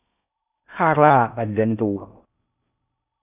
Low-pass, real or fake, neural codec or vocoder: 3.6 kHz; fake; codec, 16 kHz in and 24 kHz out, 0.6 kbps, FocalCodec, streaming, 4096 codes